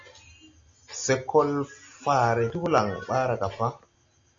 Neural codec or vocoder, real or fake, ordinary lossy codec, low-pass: none; real; AAC, 48 kbps; 7.2 kHz